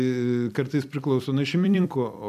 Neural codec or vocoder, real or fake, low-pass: vocoder, 44.1 kHz, 128 mel bands every 256 samples, BigVGAN v2; fake; 14.4 kHz